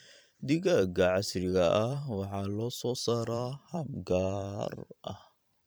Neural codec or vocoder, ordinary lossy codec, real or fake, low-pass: vocoder, 44.1 kHz, 128 mel bands every 512 samples, BigVGAN v2; none; fake; none